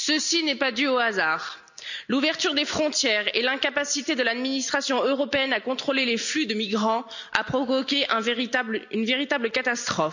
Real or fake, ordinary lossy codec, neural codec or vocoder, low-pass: real; none; none; 7.2 kHz